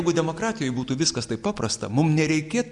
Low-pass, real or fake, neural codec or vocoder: 10.8 kHz; real; none